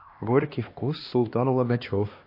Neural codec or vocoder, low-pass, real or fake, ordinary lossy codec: codec, 16 kHz, 2 kbps, X-Codec, HuBERT features, trained on LibriSpeech; 5.4 kHz; fake; MP3, 32 kbps